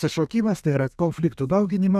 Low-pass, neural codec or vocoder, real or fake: 14.4 kHz; codec, 32 kHz, 1.9 kbps, SNAC; fake